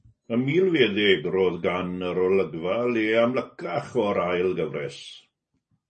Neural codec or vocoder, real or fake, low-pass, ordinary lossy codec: none; real; 10.8 kHz; MP3, 32 kbps